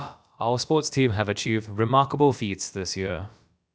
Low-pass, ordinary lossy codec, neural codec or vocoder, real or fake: none; none; codec, 16 kHz, about 1 kbps, DyCAST, with the encoder's durations; fake